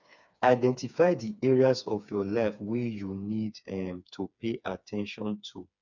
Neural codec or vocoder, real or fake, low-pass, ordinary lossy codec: codec, 16 kHz, 4 kbps, FreqCodec, smaller model; fake; 7.2 kHz; none